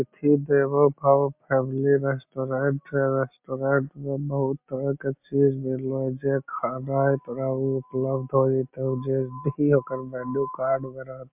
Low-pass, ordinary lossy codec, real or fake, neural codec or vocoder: 3.6 kHz; MP3, 32 kbps; real; none